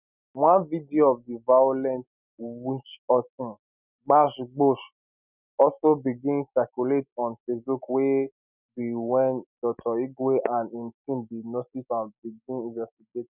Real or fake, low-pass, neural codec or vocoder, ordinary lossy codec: real; 3.6 kHz; none; none